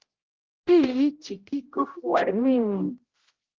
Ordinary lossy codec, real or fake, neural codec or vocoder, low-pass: Opus, 16 kbps; fake; codec, 16 kHz, 0.5 kbps, X-Codec, HuBERT features, trained on general audio; 7.2 kHz